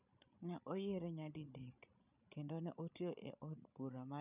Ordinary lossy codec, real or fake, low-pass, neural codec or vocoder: none; fake; 3.6 kHz; codec, 16 kHz, 8 kbps, FreqCodec, larger model